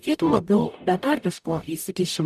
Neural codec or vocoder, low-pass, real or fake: codec, 44.1 kHz, 0.9 kbps, DAC; 14.4 kHz; fake